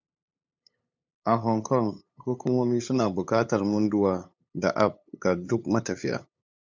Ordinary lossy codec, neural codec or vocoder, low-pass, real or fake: MP3, 64 kbps; codec, 16 kHz, 8 kbps, FunCodec, trained on LibriTTS, 25 frames a second; 7.2 kHz; fake